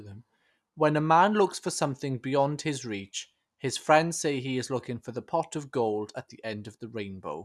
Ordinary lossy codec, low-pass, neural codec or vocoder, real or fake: none; none; none; real